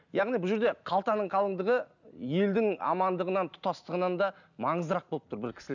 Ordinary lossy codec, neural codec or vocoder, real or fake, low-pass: none; none; real; 7.2 kHz